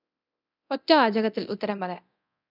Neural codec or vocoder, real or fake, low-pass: codec, 24 kHz, 0.9 kbps, DualCodec; fake; 5.4 kHz